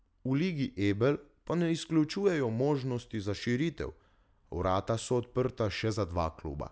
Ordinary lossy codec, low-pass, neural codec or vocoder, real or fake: none; none; none; real